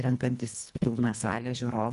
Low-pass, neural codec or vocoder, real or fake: 10.8 kHz; codec, 24 kHz, 1.5 kbps, HILCodec; fake